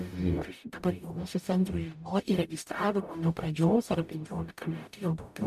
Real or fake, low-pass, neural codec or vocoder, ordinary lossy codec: fake; 14.4 kHz; codec, 44.1 kHz, 0.9 kbps, DAC; MP3, 96 kbps